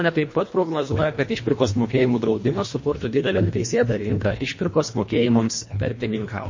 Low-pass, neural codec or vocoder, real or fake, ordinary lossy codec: 7.2 kHz; codec, 24 kHz, 1.5 kbps, HILCodec; fake; MP3, 32 kbps